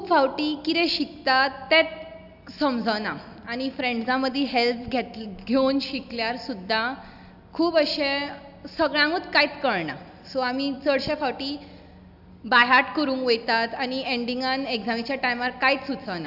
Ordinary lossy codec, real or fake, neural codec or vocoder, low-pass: none; real; none; 5.4 kHz